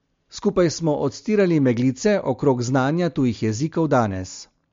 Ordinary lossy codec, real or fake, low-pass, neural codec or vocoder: MP3, 48 kbps; real; 7.2 kHz; none